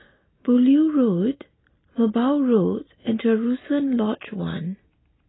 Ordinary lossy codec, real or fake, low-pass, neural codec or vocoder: AAC, 16 kbps; real; 7.2 kHz; none